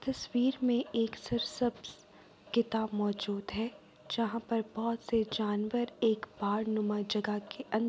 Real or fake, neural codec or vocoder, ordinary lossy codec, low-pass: real; none; none; none